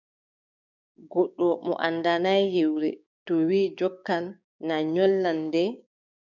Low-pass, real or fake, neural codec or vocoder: 7.2 kHz; fake; codec, 16 kHz, 6 kbps, DAC